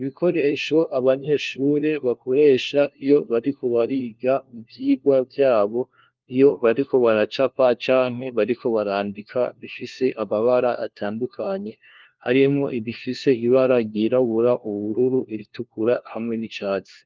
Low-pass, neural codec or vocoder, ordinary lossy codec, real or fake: 7.2 kHz; codec, 16 kHz, 1 kbps, FunCodec, trained on LibriTTS, 50 frames a second; Opus, 32 kbps; fake